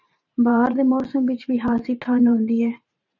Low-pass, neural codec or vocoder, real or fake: 7.2 kHz; vocoder, 44.1 kHz, 128 mel bands every 512 samples, BigVGAN v2; fake